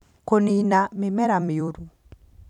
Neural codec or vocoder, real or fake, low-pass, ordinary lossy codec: vocoder, 44.1 kHz, 128 mel bands every 256 samples, BigVGAN v2; fake; 19.8 kHz; none